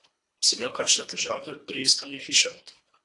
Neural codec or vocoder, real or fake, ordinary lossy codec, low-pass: codec, 24 kHz, 1.5 kbps, HILCodec; fake; AAC, 48 kbps; 10.8 kHz